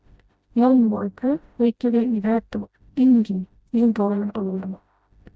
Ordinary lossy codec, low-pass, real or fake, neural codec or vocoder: none; none; fake; codec, 16 kHz, 0.5 kbps, FreqCodec, smaller model